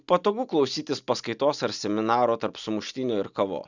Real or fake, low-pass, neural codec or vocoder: real; 7.2 kHz; none